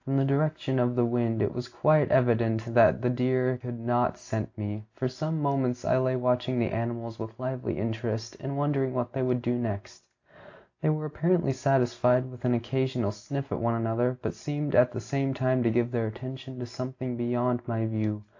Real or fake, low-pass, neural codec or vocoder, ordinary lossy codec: real; 7.2 kHz; none; AAC, 48 kbps